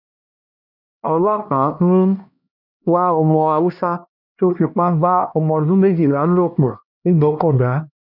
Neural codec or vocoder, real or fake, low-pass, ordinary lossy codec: codec, 16 kHz, 1 kbps, X-Codec, HuBERT features, trained on LibriSpeech; fake; 5.4 kHz; none